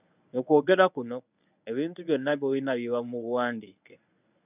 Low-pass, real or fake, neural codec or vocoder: 3.6 kHz; fake; codec, 24 kHz, 0.9 kbps, WavTokenizer, medium speech release version 1